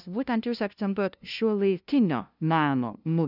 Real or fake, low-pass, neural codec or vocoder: fake; 5.4 kHz; codec, 16 kHz, 0.5 kbps, FunCodec, trained on LibriTTS, 25 frames a second